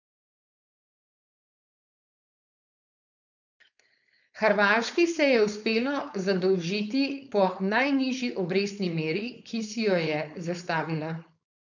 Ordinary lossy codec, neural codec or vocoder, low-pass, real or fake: none; codec, 16 kHz, 4.8 kbps, FACodec; 7.2 kHz; fake